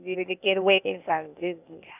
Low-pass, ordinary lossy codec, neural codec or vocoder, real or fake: 3.6 kHz; none; codec, 16 kHz, 0.8 kbps, ZipCodec; fake